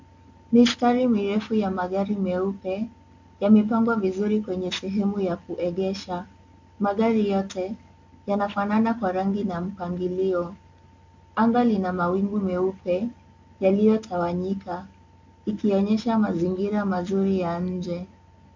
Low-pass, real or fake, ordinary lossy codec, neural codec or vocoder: 7.2 kHz; real; MP3, 48 kbps; none